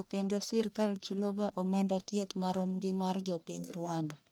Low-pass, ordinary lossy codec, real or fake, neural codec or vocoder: none; none; fake; codec, 44.1 kHz, 1.7 kbps, Pupu-Codec